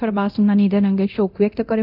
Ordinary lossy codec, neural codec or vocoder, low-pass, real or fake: none; codec, 24 kHz, 0.9 kbps, DualCodec; 5.4 kHz; fake